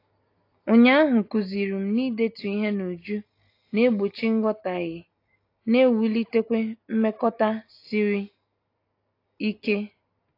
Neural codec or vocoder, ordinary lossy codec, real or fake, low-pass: none; AAC, 32 kbps; real; 5.4 kHz